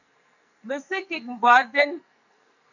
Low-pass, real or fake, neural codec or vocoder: 7.2 kHz; fake; codec, 44.1 kHz, 2.6 kbps, SNAC